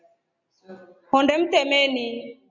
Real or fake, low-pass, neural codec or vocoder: real; 7.2 kHz; none